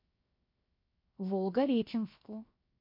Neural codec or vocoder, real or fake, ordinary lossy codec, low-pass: codec, 16 kHz, 0.7 kbps, FocalCodec; fake; MP3, 32 kbps; 5.4 kHz